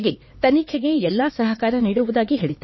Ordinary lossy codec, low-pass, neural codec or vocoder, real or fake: MP3, 24 kbps; 7.2 kHz; codec, 16 kHz, 4 kbps, X-Codec, HuBERT features, trained on LibriSpeech; fake